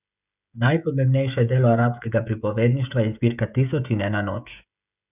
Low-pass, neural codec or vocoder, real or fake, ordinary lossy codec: 3.6 kHz; codec, 16 kHz, 16 kbps, FreqCodec, smaller model; fake; none